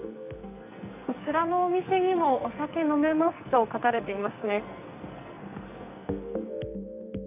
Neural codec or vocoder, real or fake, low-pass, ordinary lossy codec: codec, 44.1 kHz, 2.6 kbps, SNAC; fake; 3.6 kHz; none